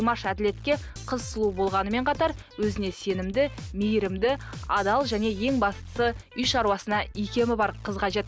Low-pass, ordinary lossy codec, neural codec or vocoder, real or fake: none; none; none; real